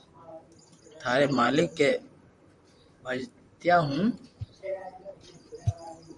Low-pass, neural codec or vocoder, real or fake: 10.8 kHz; vocoder, 44.1 kHz, 128 mel bands, Pupu-Vocoder; fake